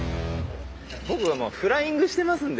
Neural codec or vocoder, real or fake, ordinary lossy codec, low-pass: none; real; none; none